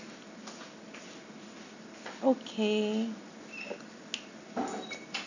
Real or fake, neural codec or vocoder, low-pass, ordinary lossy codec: real; none; 7.2 kHz; none